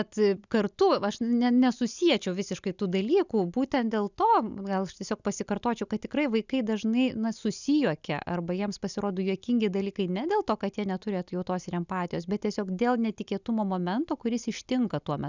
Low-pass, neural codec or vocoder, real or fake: 7.2 kHz; none; real